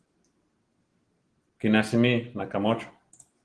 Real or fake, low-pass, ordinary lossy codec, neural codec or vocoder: real; 9.9 kHz; Opus, 16 kbps; none